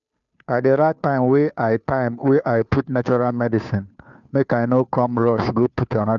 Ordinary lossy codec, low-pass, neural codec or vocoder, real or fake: none; 7.2 kHz; codec, 16 kHz, 2 kbps, FunCodec, trained on Chinese and English, 25 frames a second; fake